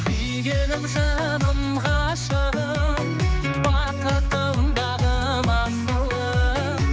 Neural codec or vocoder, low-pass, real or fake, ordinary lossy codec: codec, 16 kHz, 4 kbps, X-Codec, HuBERT features, trained on general audio; none; fake; none